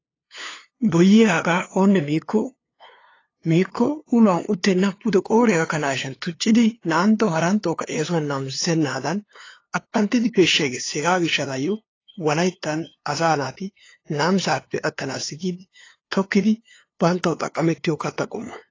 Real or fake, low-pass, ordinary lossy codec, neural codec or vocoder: fake; 7.2 kHz; AAC, 32 kbps; codec, 16 kHz, 2 kbps, FunCodec, trained on LibriTTS, 25 frames a second